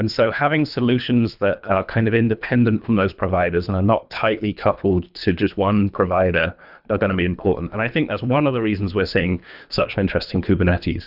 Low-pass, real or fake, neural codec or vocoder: 5.4 kHz; fake; codec, 24 kHz, 3 kbps, HILCodec